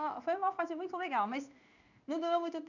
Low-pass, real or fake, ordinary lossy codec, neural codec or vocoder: 7.2 kHz; fake; none; codec, 16 kHz in and 24 kHz out, 1 kbps, XY-Tokenizer